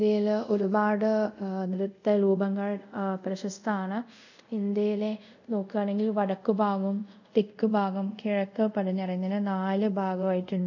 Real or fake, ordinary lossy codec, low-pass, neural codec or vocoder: fake; none; 7.2 kHz; codec, 24 kHz, 0.5 kbps, DualCodec